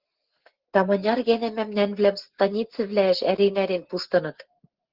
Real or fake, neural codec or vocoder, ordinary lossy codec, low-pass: real; none; Opus, 16 kbps; 5.4 kHz